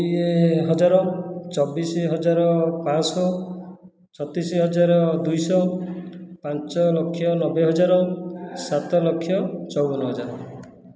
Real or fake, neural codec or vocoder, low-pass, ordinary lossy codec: real; none; none; none